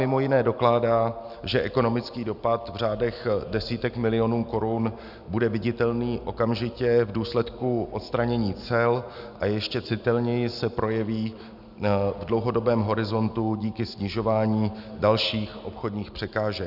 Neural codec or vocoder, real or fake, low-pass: none; real; 5.4 kHz